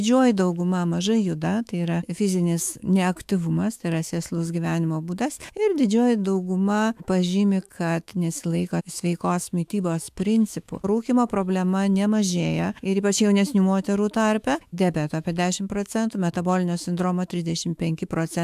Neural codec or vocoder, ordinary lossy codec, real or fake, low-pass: autoencoder, 48 kHz, 128 numbers a frame, DAC-VAE, trained on Japanese speech; AAC, 96 kbps; fake; 14.4 kHz